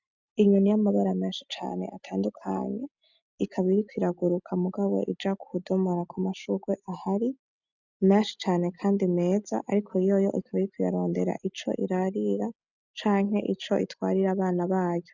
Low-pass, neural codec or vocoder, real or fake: 7.2 kHz; none; real